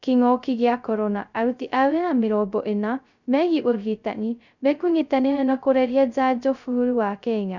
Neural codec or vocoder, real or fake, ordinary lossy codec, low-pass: codec, 16 kHz, 0.2 kbps, FocalCodec; fake; none; 7.2 kHz